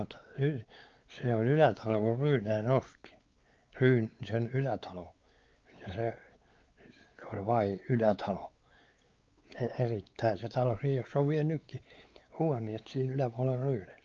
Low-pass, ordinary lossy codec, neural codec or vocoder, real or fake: 7.2 kHz; Opus, 24 kbps; codec, 16 kHz, 4 kbps, X-Codec, WavLM features, trained on Multilingual LibriSpeech; fake